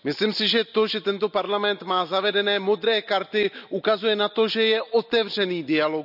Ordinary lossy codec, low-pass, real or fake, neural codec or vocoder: none; 5.4 kHz; real; none